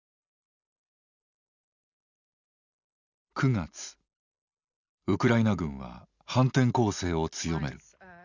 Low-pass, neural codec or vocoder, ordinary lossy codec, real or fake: 7.2 kHz; none; none; real